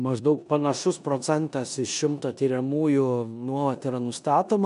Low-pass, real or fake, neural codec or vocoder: 10.8 kHz; fake; codec, 16 kHz in and 24 kHz out, 0.9 kbps, LongCat-Audio-Codec, four codebook decoder